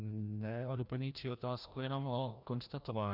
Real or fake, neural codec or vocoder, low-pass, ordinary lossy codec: fake; codec, 16 kHz, 1 kbps, FreqCodec, larger model; 5.4 kHz; Opus, 64 kbps